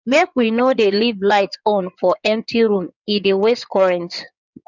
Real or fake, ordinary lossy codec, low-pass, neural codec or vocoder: fake; none; 7.2 kHz; codec, 16 kHz in and 24 kHz out, 2.2 kbps, FireRedTTS-2 codec